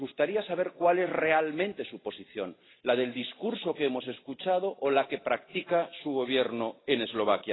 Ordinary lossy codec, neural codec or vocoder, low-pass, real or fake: AAC, 16 kbps; none; 7.2 kHz; real